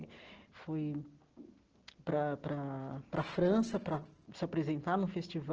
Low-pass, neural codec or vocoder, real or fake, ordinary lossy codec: 7.2 kHz; none; real; Opus, 16 kbps